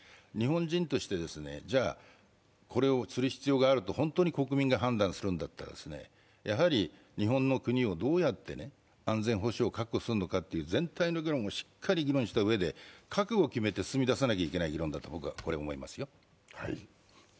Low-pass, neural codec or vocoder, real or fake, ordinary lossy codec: none; none; real; none